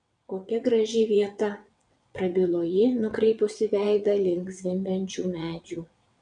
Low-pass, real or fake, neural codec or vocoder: 9.9 kHz; fake; vocoder, 22.05 kHz, 80 mel bands, WaveNeXt